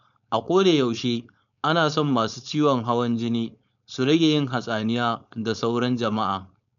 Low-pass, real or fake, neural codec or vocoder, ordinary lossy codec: 7.2 kHz; fake; codec, 16 kHz, 4.8 kbps, FACodec; none